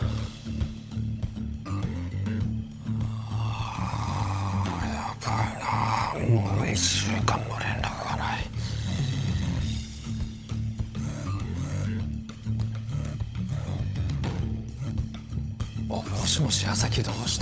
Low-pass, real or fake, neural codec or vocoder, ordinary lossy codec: none; fake; codec, 16 kHz, 16 kbps, FunCodec, trained on LibriTTS, 50 frames a second; none